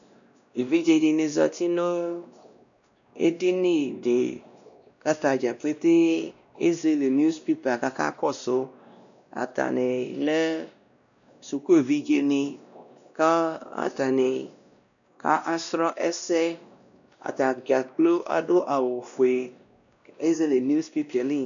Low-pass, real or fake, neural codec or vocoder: 7.2 kHz; fake; codec, 16 kHz, 1 kbps, X-Codec, WavLM features, trained on Multilingual LibriSpeech